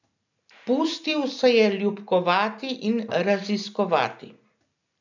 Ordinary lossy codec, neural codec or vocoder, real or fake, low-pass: none; none; real; 7.2 kHz